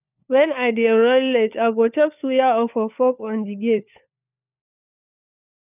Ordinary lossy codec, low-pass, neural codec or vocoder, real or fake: none; 3.6 kHz; codec, 16 kHz, 4 kbps, FunCodec, trained on LibriTTS, 50 frames a second; fake